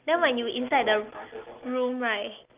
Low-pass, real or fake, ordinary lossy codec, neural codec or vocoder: 3.6 kHz; real; Opus, 24 kbps; none